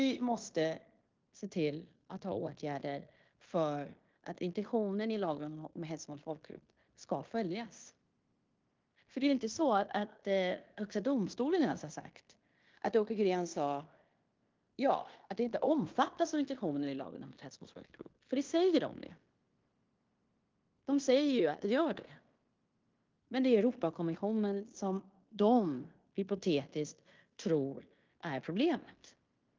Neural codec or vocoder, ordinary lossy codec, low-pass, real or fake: codec, 16 kHz in and 24 kHz out, 0.9 kbps, LongCat-Audio-Codec, fine tuned four codebook decoder; Opus, 32 kbps; 7.2 kHz; fake